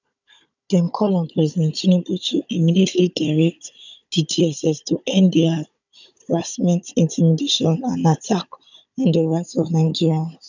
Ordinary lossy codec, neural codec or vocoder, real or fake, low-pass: none; codec, 16 kHz, 16 kbps, FunCodec, trained on Chinese and English, 50 frames a second; fake; 7.2 kHz